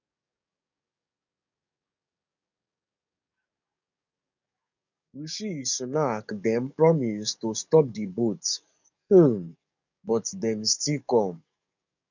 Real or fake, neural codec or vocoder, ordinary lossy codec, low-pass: fake; codec, 44.1 kHz, 7.8 kbps, DAC; none; 7.2 kHz